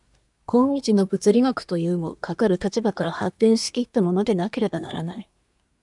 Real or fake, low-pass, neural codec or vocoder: fake; 10.8 kHz; codec, 24 kHz, 1 kbps, SNAC